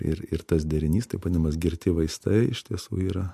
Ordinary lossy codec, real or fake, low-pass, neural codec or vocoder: MP3, 64 kbps; real; 14.4 kHz; none